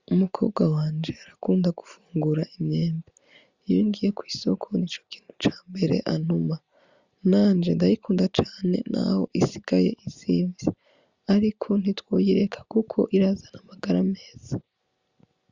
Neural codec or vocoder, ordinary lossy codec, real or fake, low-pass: none; Opus, 64 kbps; real; 7.2 kHz